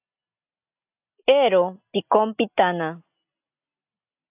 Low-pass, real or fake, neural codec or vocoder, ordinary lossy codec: 3.6 kHz; real; none; AAC, 32 kbps